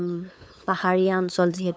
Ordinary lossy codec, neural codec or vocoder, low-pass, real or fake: none; codec, 16 kHz, 8 kbps, FunCodec, trained on LibriTTS, 25 frames a second; none; fake